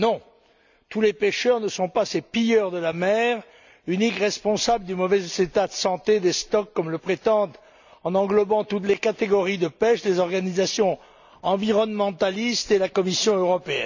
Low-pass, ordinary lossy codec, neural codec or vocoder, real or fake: 7.2 kHz; none; none; real